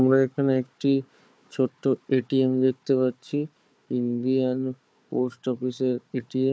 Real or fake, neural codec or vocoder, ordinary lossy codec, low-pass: fake; codec, 16 kHz, 4 kbps, FunCodec, trained on Chinese and English, 50 frames a second; none; none